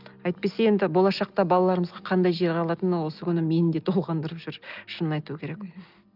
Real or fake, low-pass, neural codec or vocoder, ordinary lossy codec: real; 5.4 kHz; none; Opus, 24 kbps